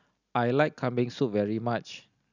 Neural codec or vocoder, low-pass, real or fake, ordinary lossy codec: none; 7.2 kHz; real; none